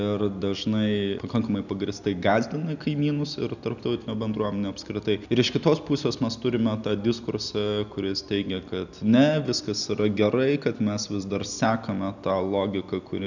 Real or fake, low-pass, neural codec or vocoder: real; 7.2 kHz; none